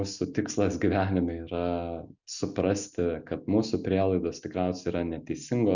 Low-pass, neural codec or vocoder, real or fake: 7.2 kHz; none; real